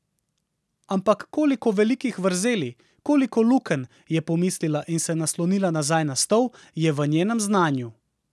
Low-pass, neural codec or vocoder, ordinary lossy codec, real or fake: none; none; none; real